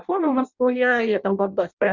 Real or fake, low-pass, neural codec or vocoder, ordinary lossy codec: fake; 7.2 kHz; codec, 16 kHz in and 24 kHz out, 0.6 kbps, FireRedTTS-2 codec; Opus, 64 kbps